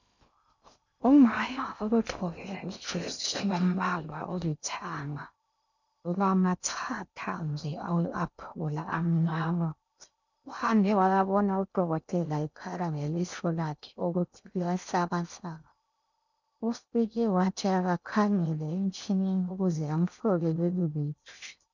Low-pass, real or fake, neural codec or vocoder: 7.2 kHz; fake; codec, 16 kHz in and 24 kHz out, 0.6 kbps, FocalCodec, streaming, 2048 codes